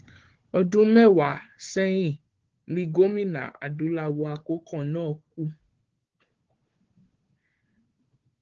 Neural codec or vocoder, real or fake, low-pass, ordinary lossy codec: codec, 16 kHz, 4 kbps, FunCodec, trained on Chinese and English, 50 frames a second; fake; 7.2 kHz; Opus, 16 kbps